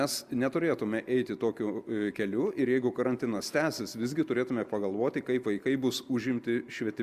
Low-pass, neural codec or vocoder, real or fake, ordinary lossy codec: 14.4 kHz; none; real; Opus, 64 kbps